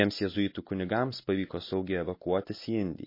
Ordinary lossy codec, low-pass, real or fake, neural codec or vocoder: MP3, 24 kbps; 5.4 kHz; real; none